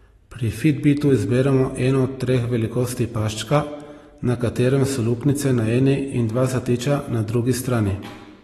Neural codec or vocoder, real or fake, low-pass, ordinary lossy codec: none; real; 19.8 kHz; AAC, 32 kbps